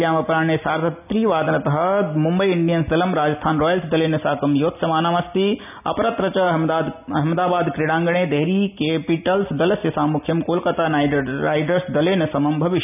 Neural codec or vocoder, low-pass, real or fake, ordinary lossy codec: none; 3.6 kHz; real; none